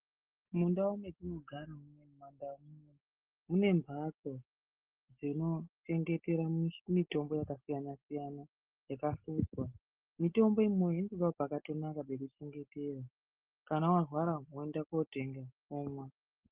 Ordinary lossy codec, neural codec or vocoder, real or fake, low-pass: Opus, 16 kbps; none; real; 3.6 kHz